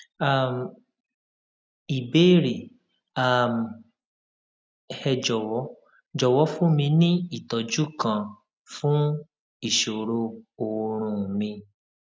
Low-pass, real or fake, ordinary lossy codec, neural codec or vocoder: none; real; none; none